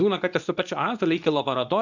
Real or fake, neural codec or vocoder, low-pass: fake; codec, 24 kHz, 0.9 kbps, WavTokenizer, medium speech release version 2; 7.2 kHz